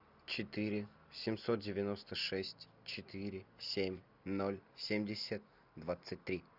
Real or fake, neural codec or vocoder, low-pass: real; none; 5.4 kHz